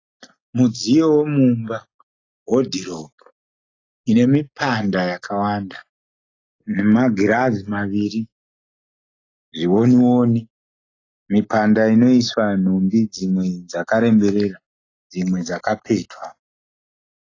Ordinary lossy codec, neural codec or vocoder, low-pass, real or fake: AAC, 32 kbps; none; 7.2 kHz; real